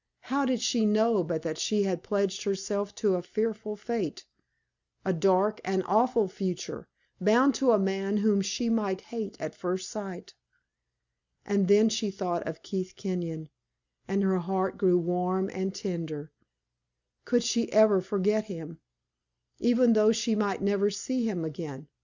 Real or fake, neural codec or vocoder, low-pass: real; none; 7.2 kHz